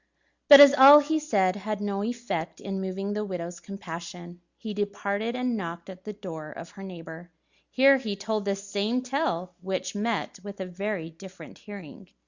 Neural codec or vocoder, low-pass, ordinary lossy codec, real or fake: none; 7.2 kHz; Opus, 64 kbps; real